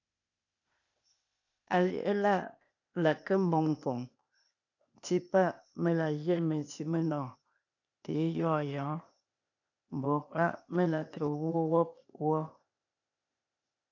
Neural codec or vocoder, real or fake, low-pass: codec, 16 kHz, 0.8 kbps, ZipCodec; fake; 7.2 kHz